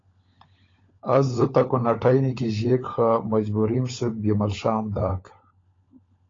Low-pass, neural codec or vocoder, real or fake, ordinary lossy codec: 7.2 kHz; codec, 16 kHz, 16 kbps, FunCodec, trained on LibriTTS, 50 frames a second; fake; AAC, 32 kbps